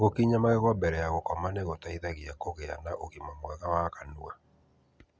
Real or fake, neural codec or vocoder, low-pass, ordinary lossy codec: real; none; none; none